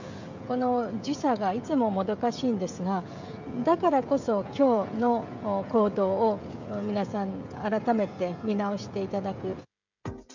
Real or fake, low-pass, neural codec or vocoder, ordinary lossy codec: fake; 7.2 kHz; codec, 16 kHz, 16 kbps, FreqCodec, smaller model; MP3, 64 kbps